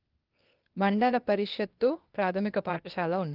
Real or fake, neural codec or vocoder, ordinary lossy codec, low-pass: fake; codec, 16 kHz, 0.8 kbps, ZipCodec; Opus, 32 kbps; 5.4 kHz